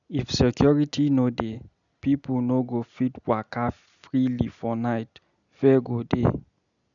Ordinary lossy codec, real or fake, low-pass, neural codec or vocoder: none; real; 7.2 kHz; none